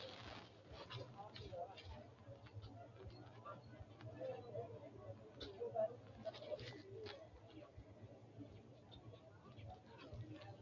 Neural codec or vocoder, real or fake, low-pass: none; real; 7.2 kHz